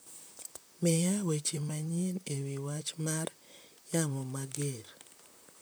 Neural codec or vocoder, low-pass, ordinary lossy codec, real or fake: vocoder, 44.1 kHz, 128 mel bands, Pupu-Vocoder; none; none; fake